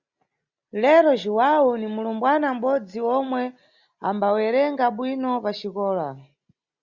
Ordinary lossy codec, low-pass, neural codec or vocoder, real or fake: Opus, 64 kbps; 7.2 kHz; none; real